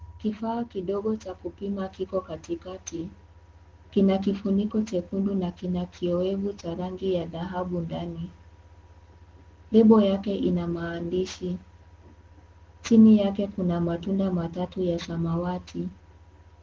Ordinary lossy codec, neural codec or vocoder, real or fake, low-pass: Opus, 16 kbps; none; real; 7.2 kHz